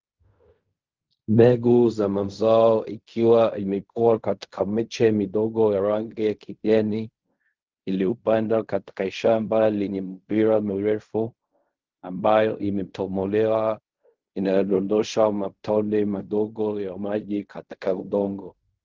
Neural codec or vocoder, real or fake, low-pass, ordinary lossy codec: codec, 16 kHz in and 24 kHz out, 0.4 kbps, LongCat-Audio-Codec, fine tuned four codebook decoder; fake; 7.2 kHz; Opus, 24 kbps